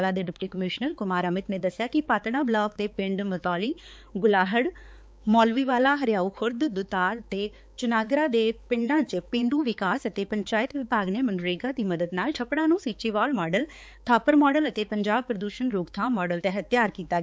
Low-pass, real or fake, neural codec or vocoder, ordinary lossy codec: none; fake; codec, 16 kHz, 4 kbps, X-Codec, HuBERT features, trained on balanced general audio; none